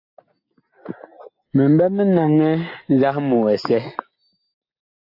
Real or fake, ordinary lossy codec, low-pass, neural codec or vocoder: real; AAC, 32 kbps; 5.4 kHz; none